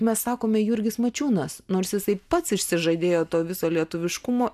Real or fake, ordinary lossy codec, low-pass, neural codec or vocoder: real; AAC, 96 kbps; 14.4 kHz; none